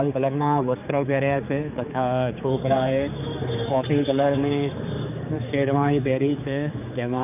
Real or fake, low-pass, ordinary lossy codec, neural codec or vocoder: fake; 3.6 kHz; none; codec, 16 kHz, 4 kbps, X-Codec, HuBERT features, trained on general audio